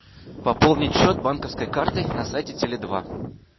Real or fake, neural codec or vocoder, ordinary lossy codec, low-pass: real; none; MP3, 24 kbps; 7.2 kHz